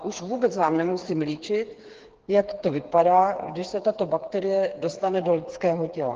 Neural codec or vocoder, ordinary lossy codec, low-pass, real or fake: codec, 16 kHz, 4 kbps, FreqCodec, smaller model; Opus, 16 kbps; 7.2 kHz; fake